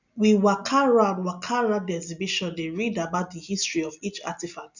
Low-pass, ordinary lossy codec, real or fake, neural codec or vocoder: 7.2 kHz; none; real; none